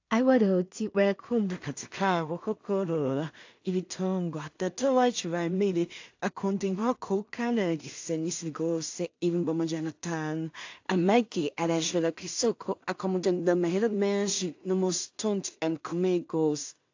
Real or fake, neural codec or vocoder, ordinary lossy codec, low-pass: fake; codec, 16 kHz in and 24 kHz out, 0.4 kbps, LongCat-Audio-Codec, two codebook decoder; AAC, 48 kbps; 7.2 kHz